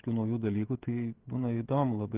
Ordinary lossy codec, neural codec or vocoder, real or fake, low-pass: Opus, 16 kbps; codec, 16 kHz, 16 kbps, FreqCodec, smaller model; fake; 3.6 kHz